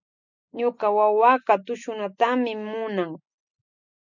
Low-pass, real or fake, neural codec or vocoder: 7.2 kHz; real; none